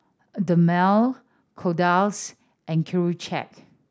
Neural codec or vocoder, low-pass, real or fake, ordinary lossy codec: none; none; real; none